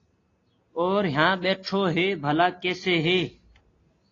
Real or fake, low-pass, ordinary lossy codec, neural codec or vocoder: real; 7.2 kHz; AAC, 32 kbps; none